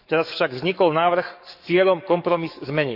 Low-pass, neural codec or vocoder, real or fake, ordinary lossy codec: 5.4 kHz; codec, 44.1 kHz, 7.8 kbps, DAC; fake; none